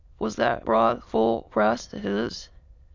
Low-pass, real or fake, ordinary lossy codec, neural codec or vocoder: 7.2 kHz; fake; Opus, 64 kbps; autoencoder, 22.05 kHz, a latent of 192 numbers a frame, VITS, trained on many speakers